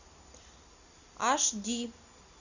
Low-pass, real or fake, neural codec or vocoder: 7.2 kHz; real; none